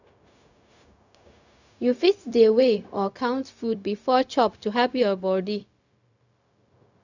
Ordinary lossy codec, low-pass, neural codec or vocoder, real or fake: none; 7.2 kHz; codec, 16 kHz, 0.4 kbps, LongCat-Audio-Codec; fake